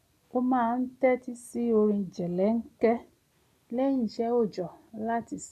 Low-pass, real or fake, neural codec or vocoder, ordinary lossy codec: 14.4 kHz; real; none; none